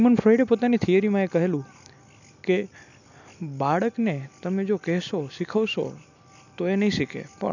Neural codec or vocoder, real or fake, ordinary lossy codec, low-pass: none; real; none; 7.2 kHz